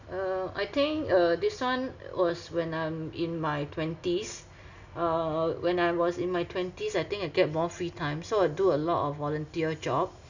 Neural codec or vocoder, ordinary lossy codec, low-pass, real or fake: none; none; 7.2 kHz; real